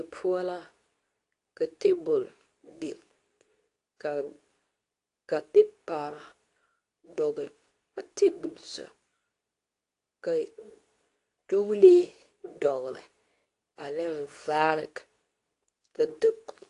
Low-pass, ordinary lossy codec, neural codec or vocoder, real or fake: 10.8 kHz; AAC, 48 kbps; codec, 24 kHz, 0.9 kbps, WavTokenizer, medium speech release version 2; fake